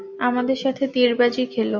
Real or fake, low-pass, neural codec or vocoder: real; 7.2 kHz; none